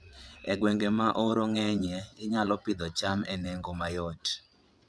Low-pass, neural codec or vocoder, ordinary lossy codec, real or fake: none; vocoder, 22.05 kHz, 80 mel bands, WaveNeXt; none; fake